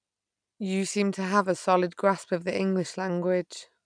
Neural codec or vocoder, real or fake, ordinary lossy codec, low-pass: none; real; none; 9.9 kHz